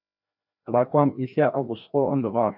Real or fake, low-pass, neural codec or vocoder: fake; 5.4 kHz; codec, 16 kHz, 1 kbps, FreqCodec, larger model